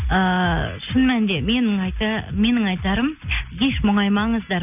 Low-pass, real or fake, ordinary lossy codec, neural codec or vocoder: 3.6 kHz; real; none; none